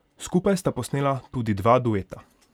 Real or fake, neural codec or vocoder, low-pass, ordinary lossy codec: real; none; 19.8 kHz; none